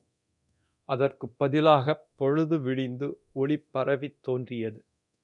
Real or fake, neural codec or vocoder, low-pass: fake; codec, 24 kHz, 0.9 kbps, DualCodec; 10.8 kHz